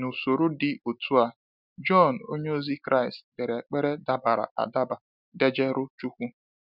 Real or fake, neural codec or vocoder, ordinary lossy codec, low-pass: real; none; none; 5.4 kHz